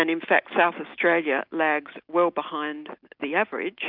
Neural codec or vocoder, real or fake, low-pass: none; real; 5.4 kHz